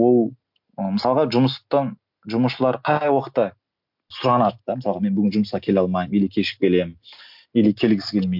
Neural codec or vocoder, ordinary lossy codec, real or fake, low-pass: none; MP3, 48 kbps; real; 5.4 kHz